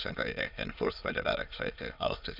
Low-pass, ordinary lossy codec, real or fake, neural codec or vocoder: 5.4 kHz; none; fake; autoencoder, 22.05 kHz, a latent of 192 numbers a frame, VITS, trained on many speakers